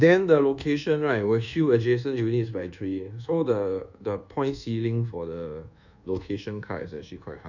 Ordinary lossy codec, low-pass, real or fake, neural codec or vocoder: none; 7.2 kHz; fake; codec, 24 kHz, 1.2 kbps, DualCodec